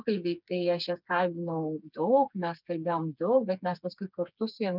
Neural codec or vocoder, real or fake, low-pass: codec, 16 kHz, 4 kbps, FreqCodec, smaller model; fake; 5.4 kHz